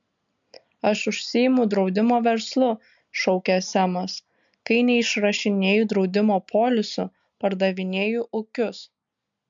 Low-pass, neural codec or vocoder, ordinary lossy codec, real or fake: 7.2 kHz; none; MP3, 64 kbps; real